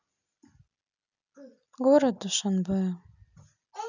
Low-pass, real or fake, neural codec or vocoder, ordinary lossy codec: 7.2 kHz; real; none; none